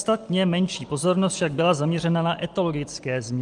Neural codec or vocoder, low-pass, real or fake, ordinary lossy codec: none; 10.8 kHz; real; Opus, 32 kbps